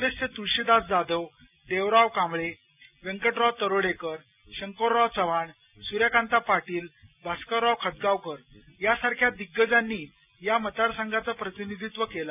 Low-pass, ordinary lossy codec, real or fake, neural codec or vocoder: 3.6 kHz; none; real; none